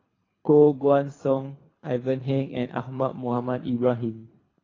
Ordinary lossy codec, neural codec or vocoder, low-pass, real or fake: AAC, 32 kbps; codec, 24 kHz, 3 kbps, HILCodec; 7.2 kHz; fake